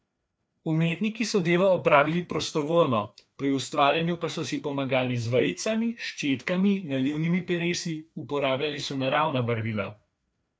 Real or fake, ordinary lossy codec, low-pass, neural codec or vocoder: fake; none; none; codec, 16 kHz, 2 kbps, FreqCodec, larger model